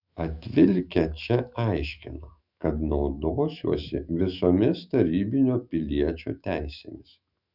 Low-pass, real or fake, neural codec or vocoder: 5.4 kHz; fake; autoencoder, 48 kHz, 128 numbers a frame, DAC-VAE, trained on Japanese speech